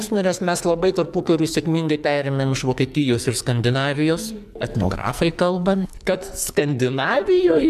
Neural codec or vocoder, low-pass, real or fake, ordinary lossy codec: codec, 44.1 kHz, 2.6 kbps, SNAC; 14.4 kHz; fake; MP3, 96 kbps